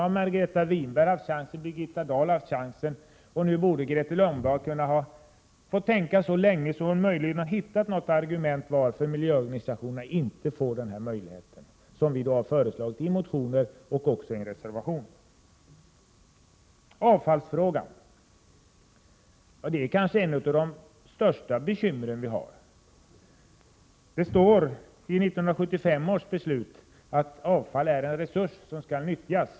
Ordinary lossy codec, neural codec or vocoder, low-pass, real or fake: none; none; none; real